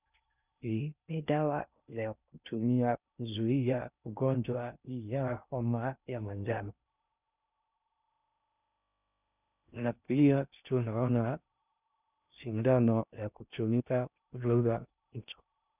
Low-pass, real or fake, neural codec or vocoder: 3.6 kHz; fake; codec, 16 kHz in and 24 kHz out, 0.6 kbps, FocalCodec, streaming, 2048 codes